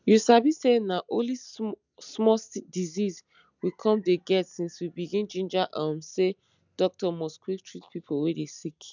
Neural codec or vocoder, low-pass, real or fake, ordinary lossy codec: none; 7.2 kHz; real; none